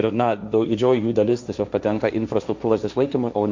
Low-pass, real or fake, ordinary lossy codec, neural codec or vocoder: 7.2 kHz; fake; MP3, 64 kbps; codec, 16 kHz, 1.1 kbps, Voila-Tokenizer